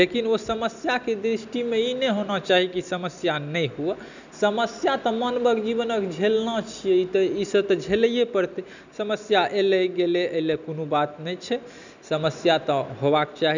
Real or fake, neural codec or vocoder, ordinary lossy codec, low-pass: real; none; none; 7.2 kHz